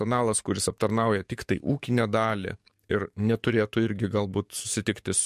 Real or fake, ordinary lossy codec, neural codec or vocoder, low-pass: fake; MP3, 64 kbps; codec, 44.1 kHz, 7.8 kbps, DAC; 14.4 kHz